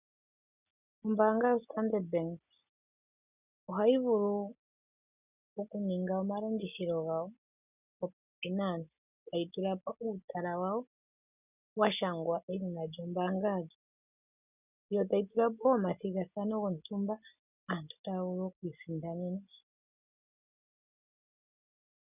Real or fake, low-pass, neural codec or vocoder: real; 3.6 kHz; none